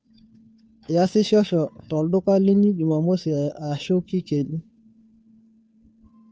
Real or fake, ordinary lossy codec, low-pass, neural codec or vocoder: fake; none; none; codec, 16 kHz, 2 kbps, FunCodec, trained on Chinese and English, 25 frames a second